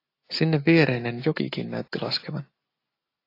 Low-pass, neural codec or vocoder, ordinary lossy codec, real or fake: 5.4 kHz; none; AAC, 32 kbps; real